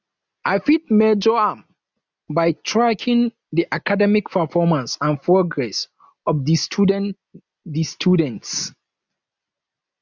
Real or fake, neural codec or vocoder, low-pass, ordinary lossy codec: real; none; 7.2 kHz; none